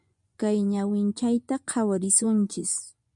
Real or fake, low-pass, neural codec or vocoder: fake; 10.8 kHz; vocoder, 24 kHz, 100 mel bands, Vocos